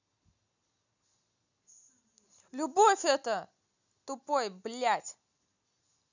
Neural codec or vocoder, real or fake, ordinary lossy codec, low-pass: none; real; none; 7.2 kHz